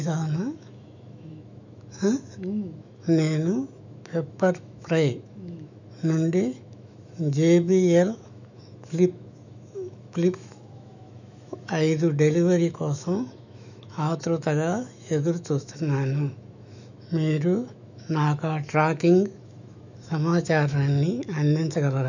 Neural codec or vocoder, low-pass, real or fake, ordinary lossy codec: autoencoder, 48 kHz, 128 numbers a frame, DAC-VAE, trained on Japanese speech; 7.2 kHz; fake; none